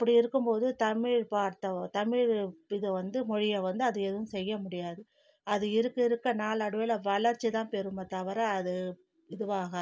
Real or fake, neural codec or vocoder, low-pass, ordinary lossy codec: real; none; none; none